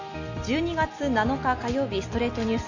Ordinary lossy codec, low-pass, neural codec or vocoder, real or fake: none; 7.2 kHz; none; real